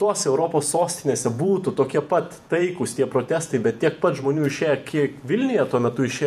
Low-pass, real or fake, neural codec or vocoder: 14.4 kHz; fake; vocoder, 48 kHz, 128 mel bands, Vocos